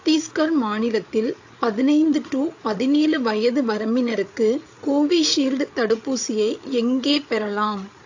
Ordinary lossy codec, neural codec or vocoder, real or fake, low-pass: AAC, 48 kbps; codec, 16 kHz, 8 kbps, FreqCodec, larger model; fake; 7.2 kHz